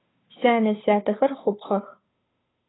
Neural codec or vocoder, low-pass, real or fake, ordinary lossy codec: codec, 16 kHz, 2 kbps, FunCodec, trained on Chinese and English, 25 frames a second; 7.2 kHz; fake; AAC, 16 kbps